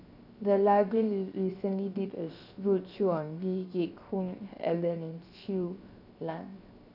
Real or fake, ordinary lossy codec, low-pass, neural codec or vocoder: fake; none; 5.4 kHz; codec, 16 kHz, 0.7 kbps, FocalCodec